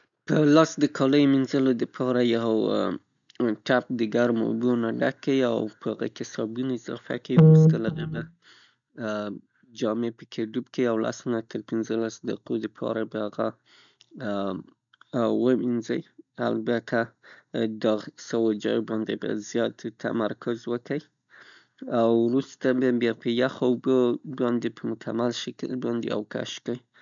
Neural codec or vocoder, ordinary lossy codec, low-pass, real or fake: none; none; 7.2 kHz; real